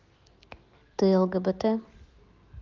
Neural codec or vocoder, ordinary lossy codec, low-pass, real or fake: none; Opus, 32 kbps; 7.2 kHz; real